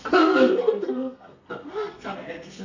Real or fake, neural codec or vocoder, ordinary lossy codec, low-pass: fake; codec, 32 kHz, 1.9 kbps, SNAC; none; 7.2 kHz